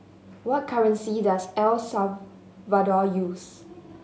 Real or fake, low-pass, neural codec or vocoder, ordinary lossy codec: real; none; none; none